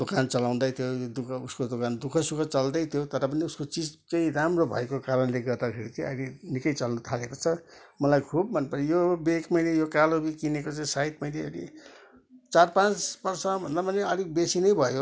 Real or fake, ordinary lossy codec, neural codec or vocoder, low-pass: real; none; none; none